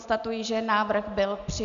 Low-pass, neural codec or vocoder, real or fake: 7.2 kHz; none; real